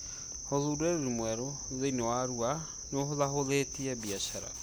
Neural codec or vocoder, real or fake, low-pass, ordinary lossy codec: none; real; none; none